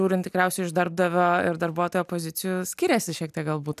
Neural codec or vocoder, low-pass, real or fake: none; 14.4 kHz; real